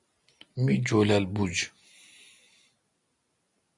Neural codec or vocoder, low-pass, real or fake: none; 10.8 kHz; real